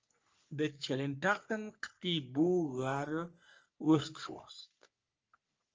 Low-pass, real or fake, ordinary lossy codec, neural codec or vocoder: 7.2 kHz; fake; Opus, 24 kbps; codec, 44.1 kHz, 3.4 kbps, Pupu-Codec